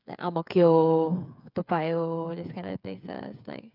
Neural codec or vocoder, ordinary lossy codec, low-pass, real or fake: codec, 16 kHz, 8 kbps, FreqCodec, smaller model; none; 5.4 kHz; fake